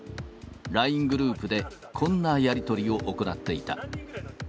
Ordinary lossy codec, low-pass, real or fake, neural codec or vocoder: none; none; real; none